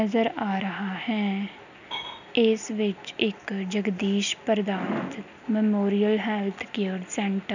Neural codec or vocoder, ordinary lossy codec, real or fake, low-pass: none; none; real; 7.2 kHz